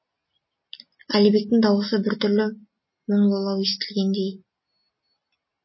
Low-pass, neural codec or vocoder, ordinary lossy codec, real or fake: 7.2 kHz; none; MP3, 24 kbps; real